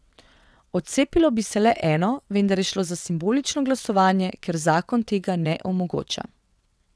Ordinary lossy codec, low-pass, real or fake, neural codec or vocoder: none; none; fake; vocoder, 22.05 kHz, 80 mel bands, WaveNeXt